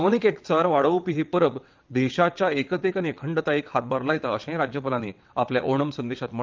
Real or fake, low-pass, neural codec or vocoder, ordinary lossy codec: fake; 7.2 kHz; vocoder, 22.05 kHz, 80 mel bands, WaveNeXt; Opus, 32 kbps